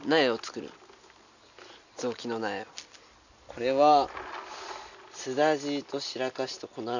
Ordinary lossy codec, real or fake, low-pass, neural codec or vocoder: none; real; 7.2 kHz; none